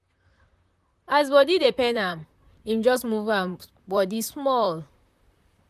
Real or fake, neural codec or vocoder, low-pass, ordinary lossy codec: fake; vocoder, 44.1 kHz, 128 mel bands, Pupu-Vocoder; 14.4 kHz; none